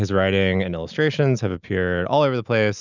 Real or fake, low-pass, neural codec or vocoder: real; 7.2 kHz; none